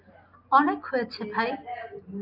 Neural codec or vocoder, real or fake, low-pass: none; real; 5.4 kHz